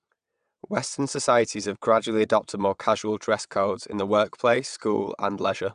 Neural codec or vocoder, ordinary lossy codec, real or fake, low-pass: vocoder, 22.05 kHz, 80 mel bands, WaveNeXt; MP3, 96 kbps; fake; 9.9 kHz